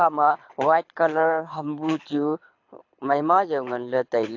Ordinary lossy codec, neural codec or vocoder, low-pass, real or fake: AAC, 48 kbps; codec, 24 kHz, 6 kbps, HILCodec; 7.2 kHz; fake